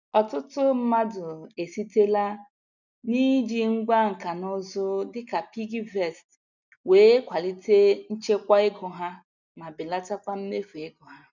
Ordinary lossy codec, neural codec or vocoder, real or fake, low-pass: none; none; real; 7.2 kHz